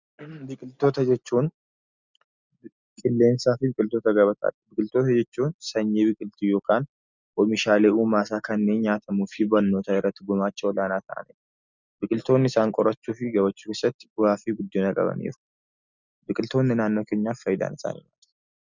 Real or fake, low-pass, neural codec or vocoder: real; 7.2 kHz; none